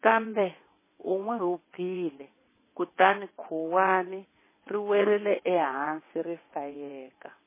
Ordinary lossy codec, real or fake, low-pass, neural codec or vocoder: MP3, 16 kbps; fake; 3.6 kHz; vocoder, 22.05 kHz, 80 mel bands, WaveNeXt